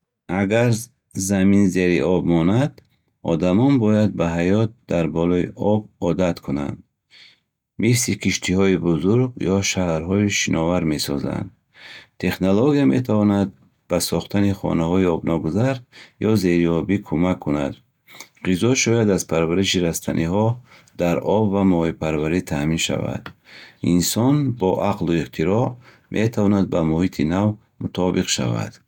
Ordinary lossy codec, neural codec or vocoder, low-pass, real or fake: none; none; 19.8 kHz; real